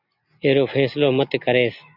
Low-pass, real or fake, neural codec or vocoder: 5.4 kHz; real; none